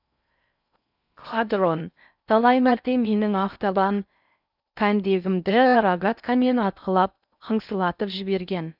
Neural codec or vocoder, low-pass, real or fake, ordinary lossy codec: codec, 16 kHz in and 24 kHz out, 0.6 kbps, FocalCodec, streaming, 4096 codes; 5.4 kHz; fake; none